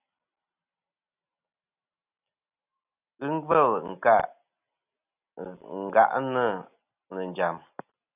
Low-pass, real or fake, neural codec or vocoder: 3.6 kHz; real; none